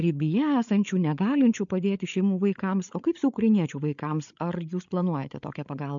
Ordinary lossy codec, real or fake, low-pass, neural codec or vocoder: MP3, 64 kbps; fake; 7.2 kHz; codec, 16 kHz, 8 kbps, FreqCodec, larger model